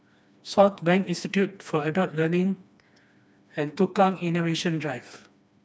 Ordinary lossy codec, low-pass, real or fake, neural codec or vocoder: none; none; fake; codec, 16 kHz, 2 kbps, FreqCodec, smaller model